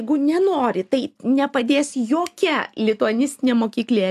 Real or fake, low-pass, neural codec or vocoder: real; 14.4 kHz; none